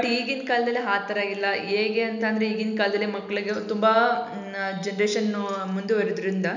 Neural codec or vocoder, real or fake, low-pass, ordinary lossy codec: none; real; 7.2 kHz; none